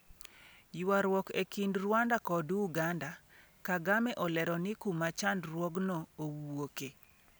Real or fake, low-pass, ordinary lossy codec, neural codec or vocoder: real; none; none; none